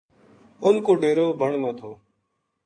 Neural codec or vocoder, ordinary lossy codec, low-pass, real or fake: codec, 16 kHz in and 24 kHz out, 2.2 kbps, FireRedTTS-2 codec; AAC, 48 kbps; 9.9 kHz; fake